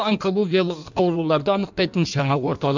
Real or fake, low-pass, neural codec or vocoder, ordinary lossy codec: fake; 7.2 kHz; codec, 16 kHz in and 24 kHz out, 1.1 kbps, FireRedTTS-2 codec; none